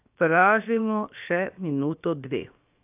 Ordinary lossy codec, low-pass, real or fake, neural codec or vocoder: AAC, 32 kbps; 3.6 kHz; fake; codec, 16 kHz, 0.7 kbps, FocalCodec